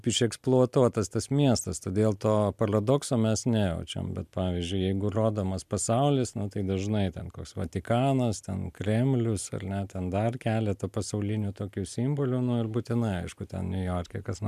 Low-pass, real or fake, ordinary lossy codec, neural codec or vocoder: 14.4 kHz; real; MP3, 96 kbps; none